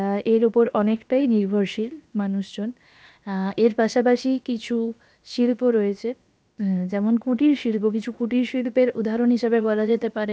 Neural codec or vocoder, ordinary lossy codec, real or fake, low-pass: codec, 16 kHz, 0.7 kbps, FocalCodec; none; fake; none